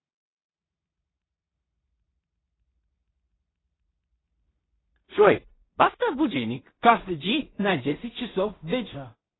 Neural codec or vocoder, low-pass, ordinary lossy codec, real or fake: codec, 16 kHz in and 24 kHz out, 0.4 kbps, LongCat-Audio-Codec, two codebook decoder; 7.2 kHz; AAC, 16 kbps; fake